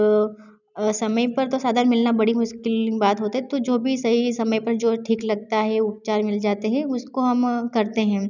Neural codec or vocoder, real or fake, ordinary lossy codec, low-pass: none; real; none; 7.2 kHz